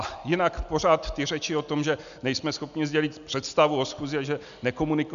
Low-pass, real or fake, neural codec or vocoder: 7.2 kHz; real; none